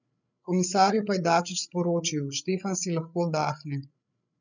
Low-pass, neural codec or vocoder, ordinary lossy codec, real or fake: 7.2 kHz; codec, 16 kHz, 8 kbps, FreqCodec, larger model; none; fake